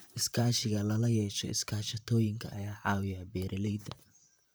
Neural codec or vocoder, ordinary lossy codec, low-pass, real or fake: vocoder, 44.1 kHz, 128 mel bands, Pupu-Vocoder; none; none; fake